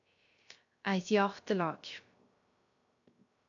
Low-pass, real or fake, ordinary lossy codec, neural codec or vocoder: 7.2 kHz; fake; AAC, 64 kbps; codec, 16 kHz, 0.3 kbps, FocalCodec